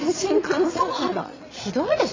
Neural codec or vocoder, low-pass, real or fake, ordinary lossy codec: vocoder, 22.05 kHz, 80 mel bands, Vocos; 7.2 kHz; fake; AAC, 32 kbps